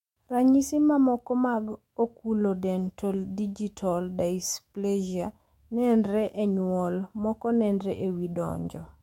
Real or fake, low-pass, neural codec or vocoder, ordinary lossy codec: fake; 19.8 kHz; autoencoder, 48 kHz, 128 numbers a frame, DAC-VAE, trained on Japanese speech; MP3, 64 kbps